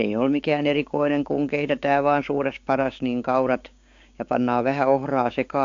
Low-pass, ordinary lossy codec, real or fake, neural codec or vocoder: 7.2 kHz; AAC, 48 kbps; fake; codec, 16 kHz, 6 kbps, DAC